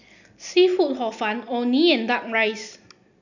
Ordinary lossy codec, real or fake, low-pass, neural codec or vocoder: none; real; 7.2 kHz; none